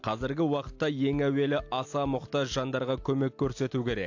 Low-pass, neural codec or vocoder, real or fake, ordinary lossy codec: 7.2 kHz; none; real; AAC, 48 kbps